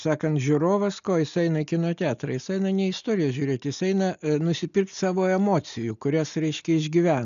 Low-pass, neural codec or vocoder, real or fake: 7.2 kHz; none; real